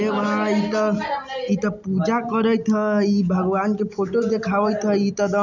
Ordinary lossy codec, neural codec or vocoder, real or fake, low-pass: none; none; real; 7.2 kHz